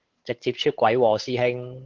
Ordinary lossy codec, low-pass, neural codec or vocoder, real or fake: Opus, 16 kbps; 7.2 kHz; codec, 16 kHz, 8 kbps, FunCodec, trained on Chinese and English, 25 frames a second; fake